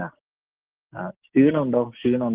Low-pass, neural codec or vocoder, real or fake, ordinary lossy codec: 3.6 kHz; none; real; Opus, 16 kbps